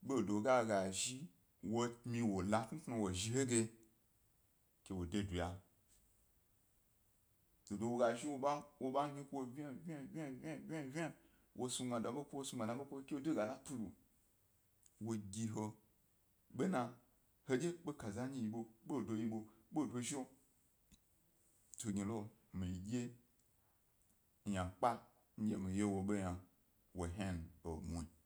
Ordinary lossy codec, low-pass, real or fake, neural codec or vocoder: none; none; real; none